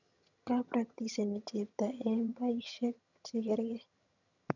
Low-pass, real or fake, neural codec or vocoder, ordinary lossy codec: 7.2 kHz; fake; vocoder, 22.05 kHz, 80 mel bands, HiFi-GAN; none